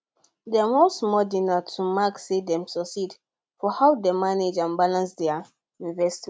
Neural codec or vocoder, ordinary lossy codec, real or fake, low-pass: none; none; real; none